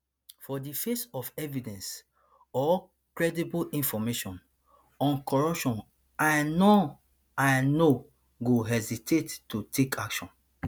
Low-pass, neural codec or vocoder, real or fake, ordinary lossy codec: none; none; real; none